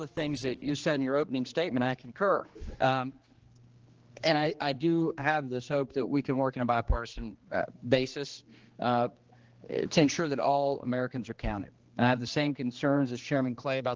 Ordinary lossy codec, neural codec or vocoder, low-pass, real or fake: Opus, 16 kbps; codec, 16 kHz, 2 kbps, X-Codec, HuBERT features, trained on general audio; 7.2 kHz; fake